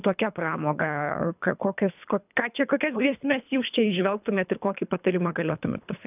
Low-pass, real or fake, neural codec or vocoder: 3.6 kHz; fake; codec, 24 kHz, 3 kbps, HILCodec